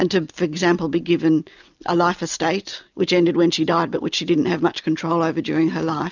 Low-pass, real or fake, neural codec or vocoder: 7.2 kHz; real; none